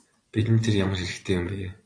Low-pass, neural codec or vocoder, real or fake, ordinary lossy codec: 9.9 kHz; vocoder, 24 kHz, 100 mel bands, Vocos; fake; AAC, 64 kbps